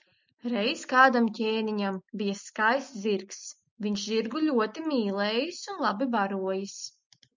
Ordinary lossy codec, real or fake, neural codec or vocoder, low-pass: MP3, 64 kbps; real; none; 7.2 kHz